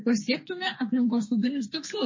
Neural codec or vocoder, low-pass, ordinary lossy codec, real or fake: codec, 32 kHz, 1.9 kbps, SNAC; 7.2 kHz; MP3, 32 kbps; fake